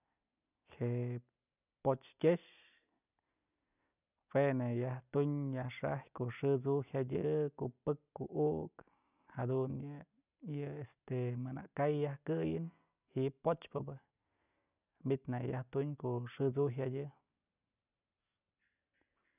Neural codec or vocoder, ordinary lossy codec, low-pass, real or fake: none; none; 3.6 kHz; real